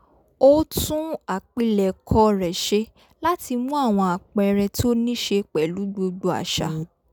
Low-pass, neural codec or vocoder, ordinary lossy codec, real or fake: 19.8 kHz; none; none; real